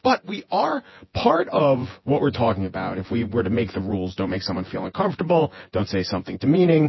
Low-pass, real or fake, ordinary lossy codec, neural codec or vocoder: 7.2 kHz; fake; MP3, 24 kbps; vocoder, 24 kHz, 100 mel bands, Vocos